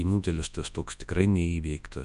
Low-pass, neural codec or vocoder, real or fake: 10.8 kHz; codec, 24 kHz, 0.9 kbps, WavTokenizer, large speech release; fake